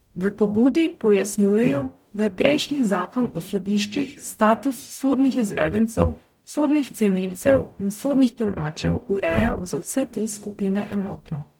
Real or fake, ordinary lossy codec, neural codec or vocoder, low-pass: fake; MP3, 96 kbps; codec, 44.1 kHz, 0.9 kbps, DAC; 19.8 kHz